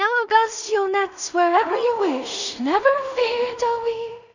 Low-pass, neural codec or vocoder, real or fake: 7.2 kHz; codec, 16 kHz in and 24 kHz out, 0.4 kbps, LongCat-Audio-Codec, two codebook decoder; fake